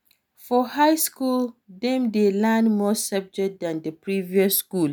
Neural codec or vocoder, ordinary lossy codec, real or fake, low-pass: none; none; real; none